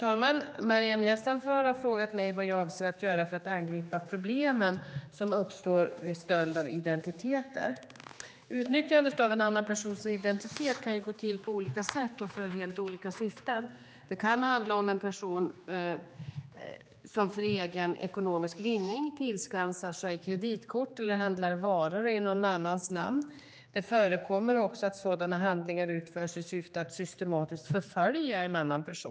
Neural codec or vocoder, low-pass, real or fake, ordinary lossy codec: codec, 16 kHz, 2 kbps, X-Codec, HuBERT features, trained on general audio; none; fake; none